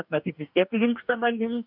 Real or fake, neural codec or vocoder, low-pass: fake; codec, 44.1 kHz, 2.6 kbps, DAC; 5.4 kHz